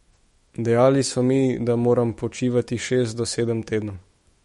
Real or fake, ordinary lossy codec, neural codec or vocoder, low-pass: fake; MP3, 48 kbps; autoencoder, 48 kHz, 128 numbers a frame, DAC-VAE, trained on Japanese speech; 19.8 kHz